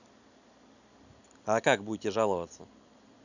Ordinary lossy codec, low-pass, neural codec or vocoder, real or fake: none; 7.2 kHz; none; real